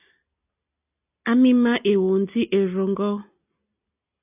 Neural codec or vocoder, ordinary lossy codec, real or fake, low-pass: none; AAC, 32 kbps; real; 3.6 kHz